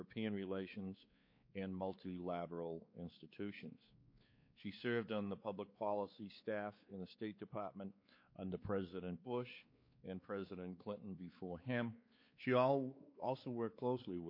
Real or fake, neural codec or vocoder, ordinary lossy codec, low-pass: fake; codec, 16 kHz, 4 kbps, X-Codec, WavLM features, trained on Multilingual LibriSpeech; MP3, 32 kbps; 5.4 kHz